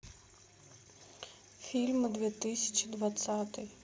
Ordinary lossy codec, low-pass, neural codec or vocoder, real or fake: none; none; none; real